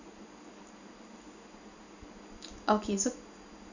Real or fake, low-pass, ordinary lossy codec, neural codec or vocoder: real; 7.2 kHz; none; none